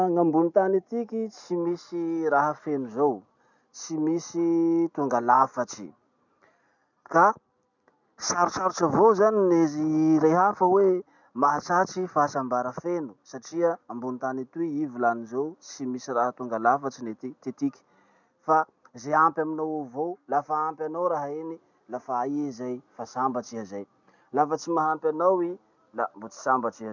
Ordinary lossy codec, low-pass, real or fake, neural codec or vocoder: none; 7.2 kHz; real; none